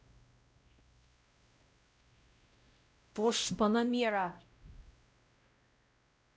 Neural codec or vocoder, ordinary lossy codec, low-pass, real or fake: codec, 16 kHz, 0.5 kbps, X-Codec, WavLM features, trained on Multilingual LibriSpeech; none; none; fake